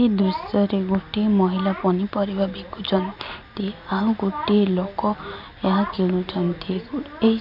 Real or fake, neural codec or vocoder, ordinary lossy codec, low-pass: real; none; none; 5.4 kHz